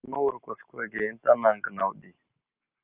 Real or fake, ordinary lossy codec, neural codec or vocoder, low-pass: real; Opus, 24 kbps; none; 3.6 kHz